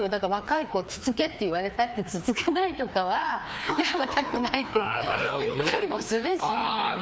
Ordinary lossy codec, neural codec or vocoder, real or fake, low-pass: none; codec, 16 kHz, 2 kbps, FreqCodec, larger model; fake; none